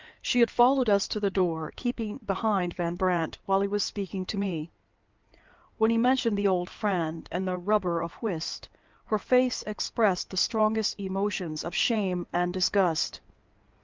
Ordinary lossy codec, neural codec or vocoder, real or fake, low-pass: Opus, 24 kbps; codec, 16 kHz in and 24 kHz out, 2.2 kbps, FireRedTTS-2 codec; fake; 7.2 kHz